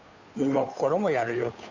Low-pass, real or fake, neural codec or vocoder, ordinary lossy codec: 7.2 kHz; fake; codec, 16 kHz, 2 kbps, FunCodec, trained on Chinese and English, 25 frames a second; none